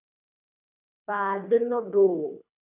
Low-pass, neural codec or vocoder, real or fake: 3.6 kHz; codec, 24 kHz, 3 kbps, HILCodec; fake